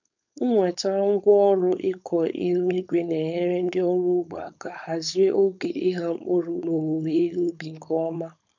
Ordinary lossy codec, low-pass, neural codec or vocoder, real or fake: none; 7.2 kHz; codec, 16 kHz, 4.8 kbps, FACodec; fake